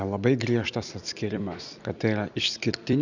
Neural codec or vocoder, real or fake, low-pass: vocoder, 44.1 kHz, 128 mel bands every 256 samples, BigVGAN v2; fake; 7.2 kHz